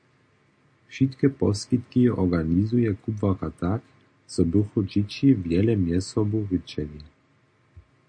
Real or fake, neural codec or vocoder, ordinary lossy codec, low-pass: real; none; MP3, 48 kbps; 9.9 kHz